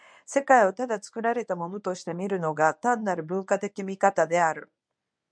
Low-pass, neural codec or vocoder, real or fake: 9.9 kHz; codec, 24 kHz, 0.9 kbps, WavTokenizer, medium speech release version 1; fake